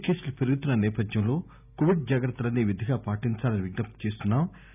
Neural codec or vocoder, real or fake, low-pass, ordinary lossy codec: none; real; 3.6 kHz; none